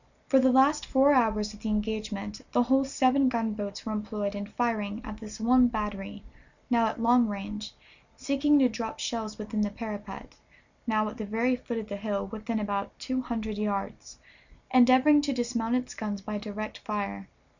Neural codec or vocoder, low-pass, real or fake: none; 7.2 kHz; real